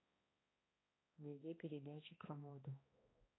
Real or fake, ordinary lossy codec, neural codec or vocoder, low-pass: fake; AAC, 16 kbps; codec, 16 kHz, 1 kbps, X-Codec, HuBERT features, trained on balanced general audio; 7.2 kHz